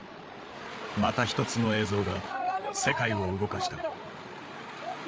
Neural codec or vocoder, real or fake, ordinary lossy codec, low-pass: codec, 16 kHz, 8 kbps, FreqCodec, larger model; fake; none; none